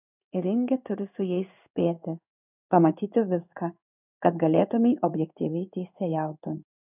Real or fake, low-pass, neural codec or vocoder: fake; 3.6 kHz; codec, 16 kHz in and 24 kHz out, 1 kbps, XY-Tokenizer